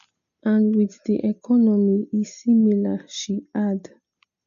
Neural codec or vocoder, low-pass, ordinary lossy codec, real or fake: none; 7.2 kHz; none; real